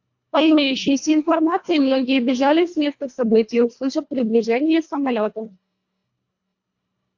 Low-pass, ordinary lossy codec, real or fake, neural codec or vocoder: 7.2 kHz; AAC, 48 kbps; fake; codec, 24 kHz, 1.5 kbps, HILCodec